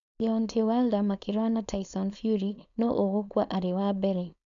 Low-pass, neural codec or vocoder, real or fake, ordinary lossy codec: 7.2 kHz; codec, 16 kHz, 4.8 kbps, FACodec; fake; none